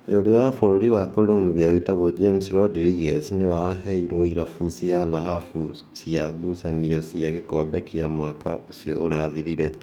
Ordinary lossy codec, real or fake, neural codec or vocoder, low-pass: none; fake; codec, 44.1 kHz, 2.6 kbps, DAC; 19.8 kHz